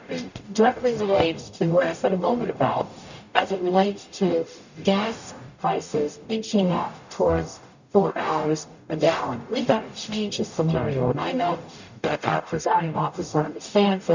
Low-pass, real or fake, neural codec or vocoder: 7.2 kHz; fake; codec, 44.1 kHz, 0.9 kbps, DAC